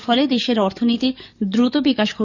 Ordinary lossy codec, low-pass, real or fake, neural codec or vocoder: none; 7.2 kHz; fake; codec, 44.1 kHz, 7.8 kbps, DAC